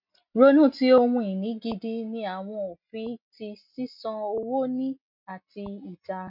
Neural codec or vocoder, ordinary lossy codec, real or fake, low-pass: none; MP3, 48 kbps; real; 5.4 kHz